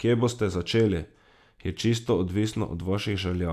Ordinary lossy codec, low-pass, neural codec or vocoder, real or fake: none; 14.4 kHz; none; real